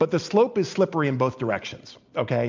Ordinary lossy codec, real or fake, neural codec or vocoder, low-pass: MP3, 64 kbps; real; none; 7.2 kHz